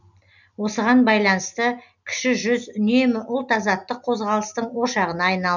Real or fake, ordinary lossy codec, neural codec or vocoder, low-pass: real; none; none; 7.2 kHz